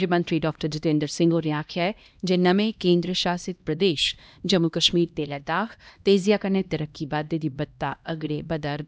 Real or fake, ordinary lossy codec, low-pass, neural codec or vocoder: fake; none; none; codec, 16 kHz, 1 kbps, X-Codec, HuBERT features, trained on LibriSpeech